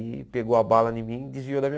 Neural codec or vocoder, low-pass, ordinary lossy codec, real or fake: none; none; none; real